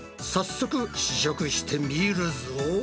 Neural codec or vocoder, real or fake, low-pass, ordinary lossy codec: none; real; none; none